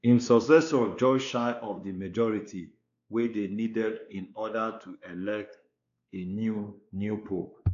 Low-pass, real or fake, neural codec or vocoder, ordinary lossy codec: 7.2 kHz; fake; codec, 16 kHz, 2 kbps, X-Codec, WavLM features, trained on Multilingual LibriSpeech; MP3, 96 kbps